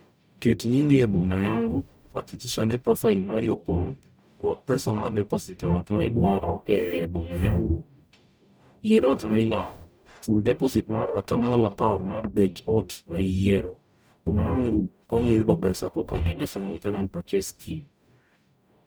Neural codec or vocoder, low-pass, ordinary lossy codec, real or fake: codec, 44.1 kHz, 0.9 kbps, DAC; none; none; fake